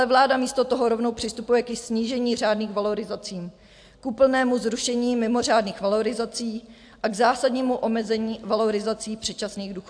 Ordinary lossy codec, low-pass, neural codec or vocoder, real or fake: AAC, 64 kbps; 9.9 kHz; none; real